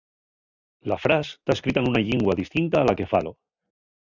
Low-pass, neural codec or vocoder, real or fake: 7.2 kHz; none; real